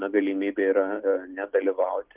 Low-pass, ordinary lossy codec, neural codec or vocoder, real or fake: 3.6 kHz; Opus, 32 kbps; none; real